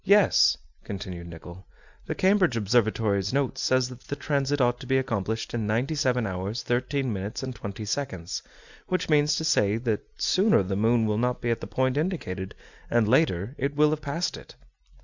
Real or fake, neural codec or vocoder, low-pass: real; none; 7.2 kHz